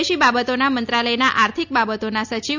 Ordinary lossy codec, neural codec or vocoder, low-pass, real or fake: MP3, 64 kbps; none; 7.2 kHz; real